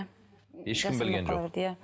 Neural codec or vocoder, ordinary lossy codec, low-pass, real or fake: none; none; none; real